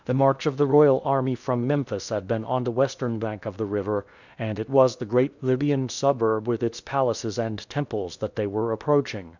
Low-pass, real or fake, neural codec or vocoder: 7.2 kHz; fake; codec, 16 kHz in and 24 kHz out, 0.6 kbps, FocalCodec, streaming, 2048 codes